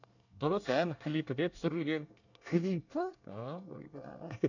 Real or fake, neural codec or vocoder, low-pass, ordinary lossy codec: fake; codec, 24 kHz, 1 kbps, SNAC; 7.2 kHz; none